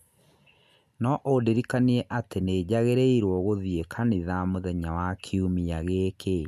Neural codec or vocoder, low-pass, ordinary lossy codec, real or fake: none; 14.4 kHz; none; real